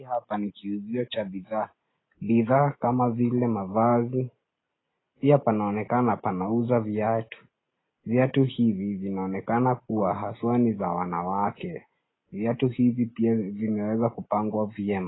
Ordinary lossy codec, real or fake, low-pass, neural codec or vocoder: AAC, 16 kbps; real; 7.2 kHz; none